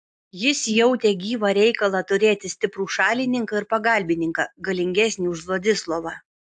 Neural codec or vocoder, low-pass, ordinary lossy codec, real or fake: none; 10.8 kHz; AAC, 64 kbps; real